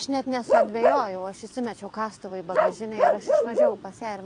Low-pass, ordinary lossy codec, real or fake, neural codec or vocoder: 9.9 kHz; AAC, 48 kbps; fake; vocoder, 22.05 kHz, 80 mel bands, WaveNeXt